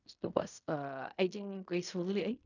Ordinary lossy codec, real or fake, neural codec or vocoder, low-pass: none; fake; codec, 16 kHz in and 24 kHz out, 0.4 kbps, LongCat-Audio-Codec, fine tuned four codebook decoder; 7.2 kHz